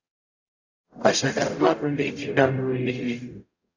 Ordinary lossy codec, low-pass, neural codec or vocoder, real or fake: AAC, 48 kbps; 7.2 kHz; codec, 44.1 kHz, 0.9 kbps, DAC; fake